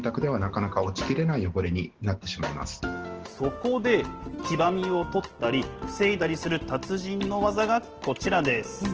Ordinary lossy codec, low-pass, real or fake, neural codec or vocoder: Opus, 16 kbps; 7.2 kHz; real; none